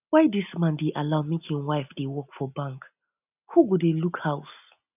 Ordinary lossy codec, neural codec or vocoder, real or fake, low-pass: none; none; real; 3.6 kHz